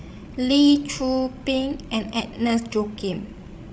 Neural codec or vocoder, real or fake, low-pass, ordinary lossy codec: codec, 16 kHz, 16 kbps, FreqCodec, larger model; fake; none; none